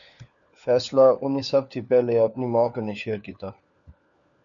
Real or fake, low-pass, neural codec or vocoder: fake; 7.2 kHz; codec, 16 kHz, 4 kbps, FunCodec, trained on LibriTTS, 50 frames a second